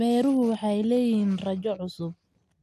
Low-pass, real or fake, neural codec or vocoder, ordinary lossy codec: 14.4 kHz; real; none; AAC, 96 kbps